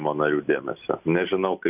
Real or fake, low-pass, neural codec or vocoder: real; 3.6 kHz; none